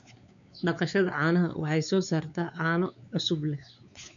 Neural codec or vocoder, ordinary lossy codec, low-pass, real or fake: codec, 16 kHz, 4 kbps, X-Codec, WavLM features, trained on Multilingual LibriSpeech; none; 7.2 kHz; fake